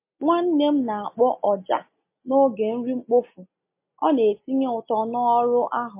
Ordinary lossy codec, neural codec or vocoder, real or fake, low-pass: MP3, 24 kbps; none; real; 3.6 kHz